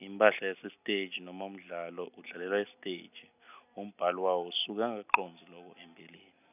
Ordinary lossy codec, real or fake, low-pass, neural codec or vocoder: none; real; 3.6 kHz; none